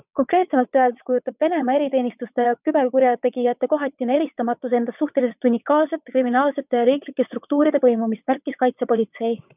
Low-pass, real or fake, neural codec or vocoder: 3.6 kHz; fake; vocoder, 22.05 kHz, 80 mel bands, WaveNeXt